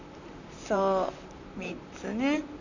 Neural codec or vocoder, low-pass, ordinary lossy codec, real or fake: vocoder, 44.1 kHz, 128 mel bands, Pupu-Vocoder; 7.2 kHz; none; fake